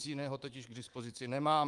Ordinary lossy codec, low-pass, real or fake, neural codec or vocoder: Opus, 32 kbps; 10.8 kHz; fake; codec, 24 kHz, 3.1 kbps, DualCodec